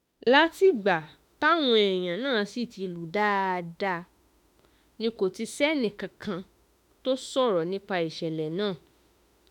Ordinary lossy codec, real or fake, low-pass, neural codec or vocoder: none; fake; 19.8 kHz; autoencoder, 48 kHz, 32 numbers a frame, DAC-VAE, trained on Japanese speech